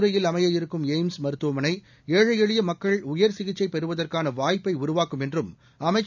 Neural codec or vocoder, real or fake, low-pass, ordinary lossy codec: none; real; 7.2 kHz; none